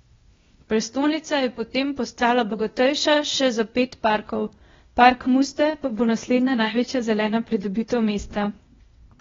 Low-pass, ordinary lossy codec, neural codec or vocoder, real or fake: 7.2 kHz; AAC, 24 kbps; codec, 16 kHz, 0.8 kbps, ZipCodec; fake